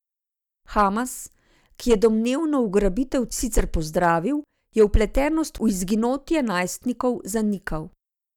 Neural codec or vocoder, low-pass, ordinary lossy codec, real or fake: none; 19.8 kHz; none; real